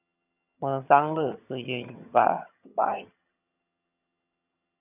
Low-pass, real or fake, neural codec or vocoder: 3.6 kHz; fake; vocoder, 22.05 kHz, 80 mel bands, HiFi-GAN